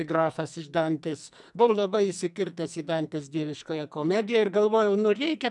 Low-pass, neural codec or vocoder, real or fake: 10.8 kHz; codec, 44.1 kHz, 2.6 kbps, SNAC; fake